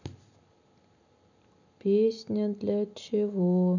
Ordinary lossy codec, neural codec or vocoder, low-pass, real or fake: none; none; 7.2 kHz; real